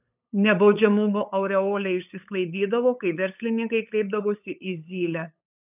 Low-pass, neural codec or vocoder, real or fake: 3.6 kHz; codec, 16 kHz, 8 kbps, FunCodec, trained on LibriTTS, 25 frames a second; fake